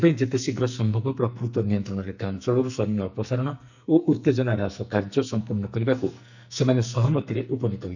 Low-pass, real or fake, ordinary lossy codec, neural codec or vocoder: 7.2 kHz; fake; none; codec, 44.1 kHz, 2.6 kbps, SNAC